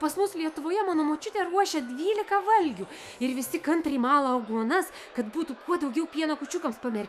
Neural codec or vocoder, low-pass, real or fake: autoencoder, 48 kHz, 128 numbers a frame, DAC-VAE, trained on Japanese speech; 14.4 kHz; fake